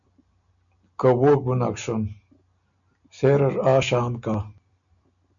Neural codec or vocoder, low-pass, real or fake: none; 7.2 kHz; real